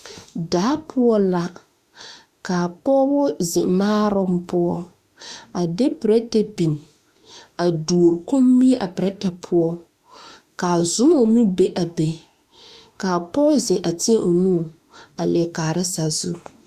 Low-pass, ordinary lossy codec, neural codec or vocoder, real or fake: 14.4 kHz; Opus, 64 kbps; autoencoder, 48 kHz, 32 numbers a frame, DAC-VAE, trained on Japanese speech; fake